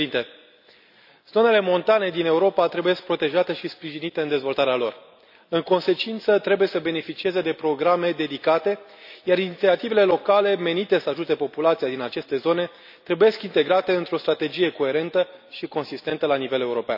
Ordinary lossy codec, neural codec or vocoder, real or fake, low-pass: none; none; real; 5.4 kHz